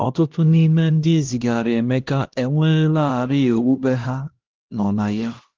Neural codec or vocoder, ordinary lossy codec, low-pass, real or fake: codec, 16 kHz, 1 kbps, X-Codec, HuBERT features, trained on LibriSpeech; Opus, 16 kbps; 7.2 kHz; fake